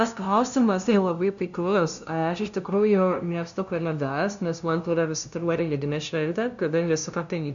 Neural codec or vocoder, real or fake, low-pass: codec, 16 kHz, 0.5 kbps, FunCodec, trained on LibriTTS, 25 frames a second; fake; 7.2 kHz